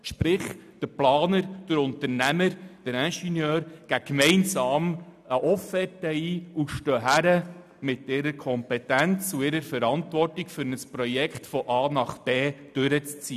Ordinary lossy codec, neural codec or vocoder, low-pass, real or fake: none; none; 14.4 kHz; real